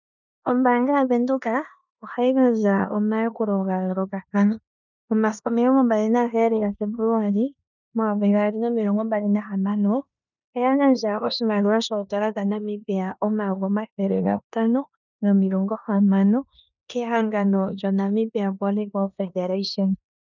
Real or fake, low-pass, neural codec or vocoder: fake; 7.2 kHz; codec, 16 kHz in and 24 kHz out, 0.9 kbps, LongCat-Audio-Codec, four codebook decoder